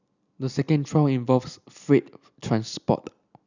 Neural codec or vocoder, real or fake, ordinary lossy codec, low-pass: none; real; none; 7.2 kHz